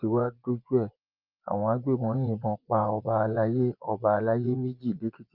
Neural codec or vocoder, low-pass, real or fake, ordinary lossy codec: vocoder, 24 kHz, 100 mel bands, Vocos; 5.4 kHz; fake; Opus, 24 kbps